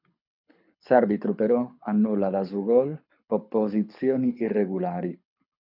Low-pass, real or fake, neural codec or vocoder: 5.4 kHz; fake; codec, 24 kHz, 6 kbps, HILCodec